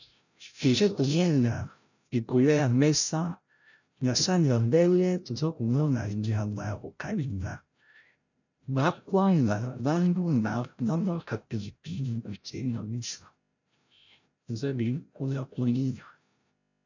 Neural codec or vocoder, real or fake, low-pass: codec, 16 kHz, 0.5 kbps, FreqCodec, larger model; fake; 7.2 kHz